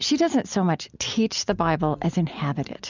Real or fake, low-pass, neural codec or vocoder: real; 7.2 kHz; none